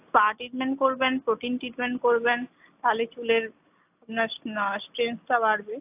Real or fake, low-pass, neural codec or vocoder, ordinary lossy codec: real; 3.6 kHz; none; AAC, 32 kbps